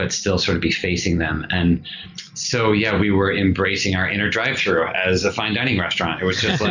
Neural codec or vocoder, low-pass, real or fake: none; 7.2 kHz; real